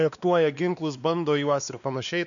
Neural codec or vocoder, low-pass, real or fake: codec, 16 kHz, 2 kbps, X-Codec, WavLM features, trained on Multilingual LibriSpeech; 7.2 kHz; fake